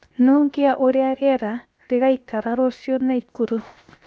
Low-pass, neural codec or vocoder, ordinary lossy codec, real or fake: none; codec, 16 kHz, 0.7 kbps, FocalCodec; none; fake